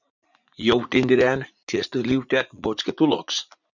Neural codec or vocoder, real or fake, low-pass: vocoder, 44.1 kHz, 80 mel bands, Vocos; fake; 7.2 kHz